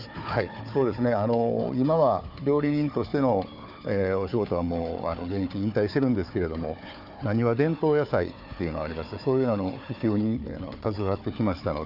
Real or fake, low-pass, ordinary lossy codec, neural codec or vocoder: fake; 5.4 kHz; none; codec, 16 kHz, 4 kbps, FunCodec, trained on Chinese and English, 50 frames a second